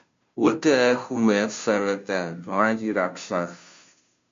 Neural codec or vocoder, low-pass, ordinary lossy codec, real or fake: codec, 16 kHz, 0.5 kbps, FunCodec, trained on Chinese and English, 25 frames a second; 7.2 kHz; MP3, 48 kbps; fake